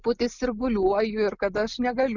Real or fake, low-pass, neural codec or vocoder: real; 7.2 kHz; none